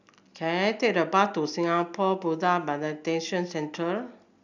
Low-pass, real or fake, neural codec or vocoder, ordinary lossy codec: 7.2 kHz; real; none; none